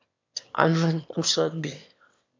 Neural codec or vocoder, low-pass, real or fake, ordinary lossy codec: autoencoder, 22.05 kHz, a latent of 192 numbers a frame, VITS, trained on one speaker; 7.2 kHz; fake; MP3, 48 kbps